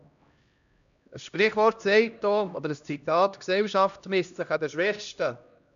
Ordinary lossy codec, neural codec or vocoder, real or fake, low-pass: none; codec, 16 kHz, 1 kbps, X-Codec, HuBERT features, trained on LibriSpeech; fake; 7.2 kHz